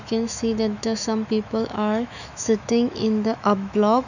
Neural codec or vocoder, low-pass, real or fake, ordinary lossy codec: autoencoder, 48 kHz, 128 numbers a frame, DAC-VAE, trained on Japanese speech; 7.2 kHz; fake; none